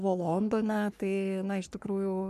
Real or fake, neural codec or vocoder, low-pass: fake; codec, 44.1 kHz, 3.4 kbps, Pupu-Codec; 14.4 kHz